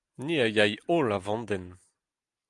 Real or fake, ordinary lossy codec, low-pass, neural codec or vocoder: real; Opus, 32 kbps; 10.8 kHz; none